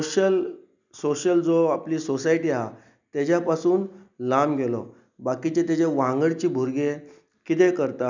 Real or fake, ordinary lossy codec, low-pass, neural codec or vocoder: real; none; 7.2 kHz; none